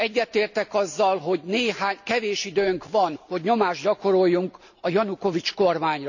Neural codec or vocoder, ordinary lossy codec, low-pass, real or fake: none; none; 7.2 kHz; real